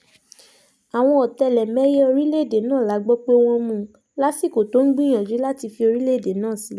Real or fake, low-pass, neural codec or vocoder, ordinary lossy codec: real; none; none; none